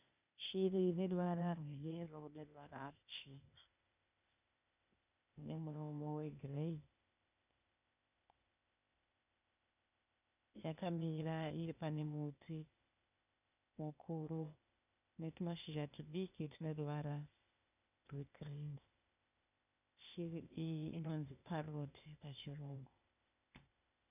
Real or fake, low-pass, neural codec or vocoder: fake; 3.6 kHz; codec, 16 kHz, 0.8 kbps, ZipCodec